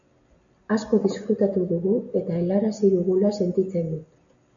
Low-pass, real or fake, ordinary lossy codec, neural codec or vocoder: 7.2 kHz; real; MP3, 96 kbps; none